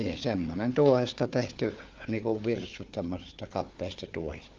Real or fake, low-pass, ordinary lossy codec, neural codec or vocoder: fake; 7.2 kHz; Opus, 24 kbps; codec, 16 kHz, 4 kbps, FunCodec, trained on Chinese and English, 50 frames a second